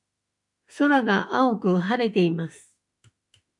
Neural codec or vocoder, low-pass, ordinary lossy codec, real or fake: autoencoder, 48 kHz, 32 numbers a frame, DAC-VAE, trained on Japanese speech; 10.8 kHz; MP3, 96 kbps; fake